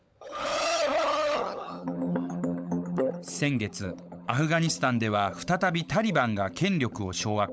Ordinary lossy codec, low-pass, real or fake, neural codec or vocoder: none; none; fake; codec, 16 kHz, 16 kbps, FunCodec, trained on LibriTTS, 50 frames a second